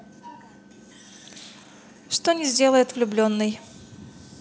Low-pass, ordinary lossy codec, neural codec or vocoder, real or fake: none; none; none; real